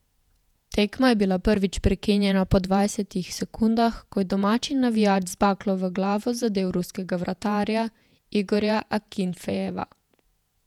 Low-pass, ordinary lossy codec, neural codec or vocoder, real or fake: 19.8 kHz; none; vocoder, 48 kHz, 128 mel bands, Vocos; fake